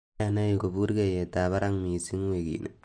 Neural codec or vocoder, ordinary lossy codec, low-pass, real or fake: none; MP3, 48 kbps; 9.9 kHz; real